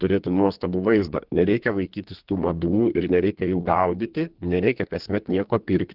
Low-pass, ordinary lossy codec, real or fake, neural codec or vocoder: 5.4 kHz; Opus, 16 kbps; fake; codec, 32 kHz, 1.9 kbps, SNAC